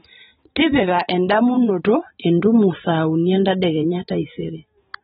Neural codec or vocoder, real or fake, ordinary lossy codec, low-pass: vocoder, 44.1 kHz, 128 mel bands every 512 samples, BigVGAN v2; fake; AAC, 16 kbps; 19.8 kHz